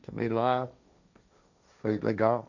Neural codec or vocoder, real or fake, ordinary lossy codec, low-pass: codec, 16 kHz, 1.1 kbps, Voila-Tokenizer; fake; none; 7.2 kHz